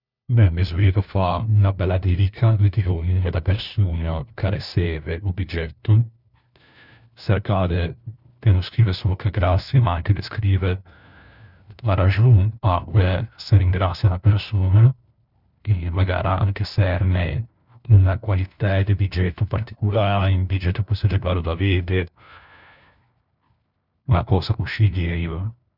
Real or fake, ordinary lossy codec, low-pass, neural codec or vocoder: fake; none; 5.4 kHz; codec, 16 kHz, 1 kbps, FunCodec, trained on LibriTTS, 50 frames a second